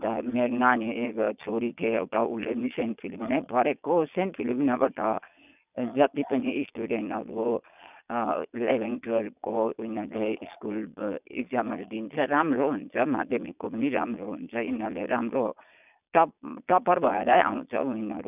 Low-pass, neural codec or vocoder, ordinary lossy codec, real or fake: 3.6 kHz; vocoder, 22.05 kHz, 80 mel bands, Vocos; none; fake